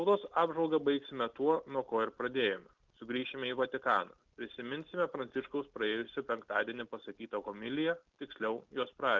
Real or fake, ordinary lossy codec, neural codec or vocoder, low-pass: real; Opus, 32 kbps; none; 7.2 kHz